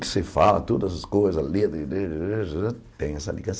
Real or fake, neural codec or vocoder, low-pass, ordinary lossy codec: real; none; none; none